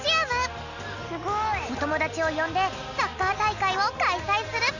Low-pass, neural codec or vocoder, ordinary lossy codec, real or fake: 7.2 kHz; none; none; real